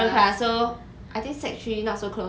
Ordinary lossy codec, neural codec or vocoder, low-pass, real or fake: none; none; none; real